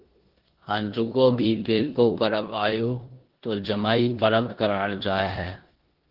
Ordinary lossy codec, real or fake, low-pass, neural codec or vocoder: Opus, 16 kbps; fake; 5.4 kHz; codec, 16 kHz in and 24 kHz out, 0.9 kbps, LongCat-Audio-Codec, four codebook decoder